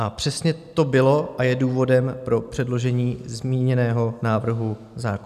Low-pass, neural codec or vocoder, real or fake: 14.4 kHz; none; real